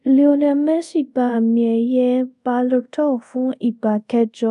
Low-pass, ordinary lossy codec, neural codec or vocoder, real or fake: 10.8 kHz; none; codec, 24 kHz, 0.5 kbps, DualCodec; fake